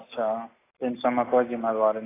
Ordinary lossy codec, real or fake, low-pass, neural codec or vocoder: AAC, 16 kbps; real; 3.6 kHz; none